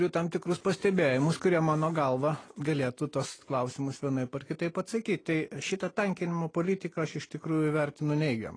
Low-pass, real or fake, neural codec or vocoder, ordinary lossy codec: 9.9 kHz; real; none; AAC, 32 kbps